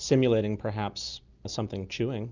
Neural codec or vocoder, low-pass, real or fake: none; 7.2 kHz; real